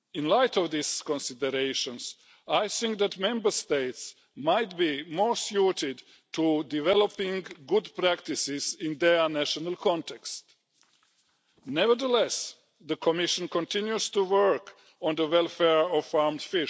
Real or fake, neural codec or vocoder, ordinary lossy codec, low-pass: real; none; none; none